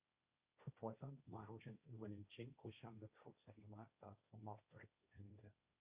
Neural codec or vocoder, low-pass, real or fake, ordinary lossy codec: codec, 16 kHz, 1.1 kbps, Voila-Tokenizer; 3.6 kHz; fake; Opus, 64 kbps